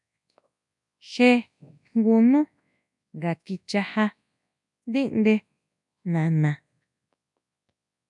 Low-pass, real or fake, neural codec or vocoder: 10.8 kHz; fake; codec, 24 kHz, 0.9 kbps, WavTokenizer, large speech release